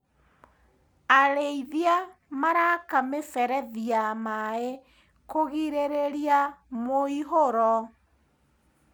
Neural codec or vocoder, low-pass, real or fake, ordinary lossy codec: none; none; real; none